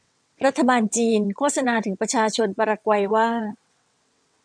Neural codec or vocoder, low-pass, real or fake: vocoder, 22.05 kHz, 80 mel bands, WaveNeXt; 9.9 kHz; fake